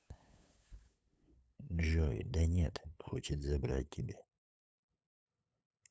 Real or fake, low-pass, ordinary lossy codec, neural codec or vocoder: fake; none; none; codec, 16 kHz, 8 kbps, FunCodec, trained on LibriTTS, 25 frames a second